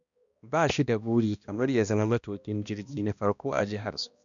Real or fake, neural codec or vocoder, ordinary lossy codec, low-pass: fake; codec, 16 kHz, 1 kbps, X-Codec, HuBERT features, trained on balanced general audio; none; 7.2 kHz